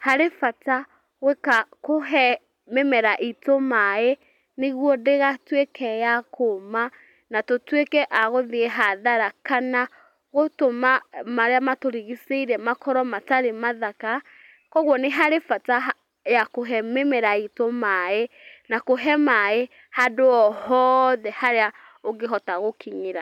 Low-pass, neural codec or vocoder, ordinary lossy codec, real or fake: 19.8 kHz; none; none; real